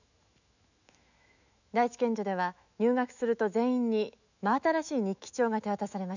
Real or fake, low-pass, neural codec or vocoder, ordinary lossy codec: fake; 7.2 kHz; autoencoder, 48 kHz, 128 numbers a frame, DAC-VAE, trained on Japanese speech; none